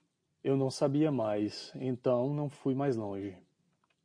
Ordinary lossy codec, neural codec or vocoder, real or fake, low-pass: MP3, 48 kbps; none; real; 9.9 kHz